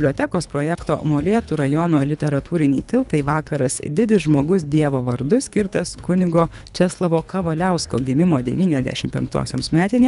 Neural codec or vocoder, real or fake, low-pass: codec, 24 kHz, 3 kbps, HILCodec; fake; 10.8 kHz